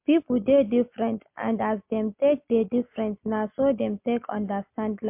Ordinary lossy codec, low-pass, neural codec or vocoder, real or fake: MP3, 32 kbps; 3.6 kHz; none; real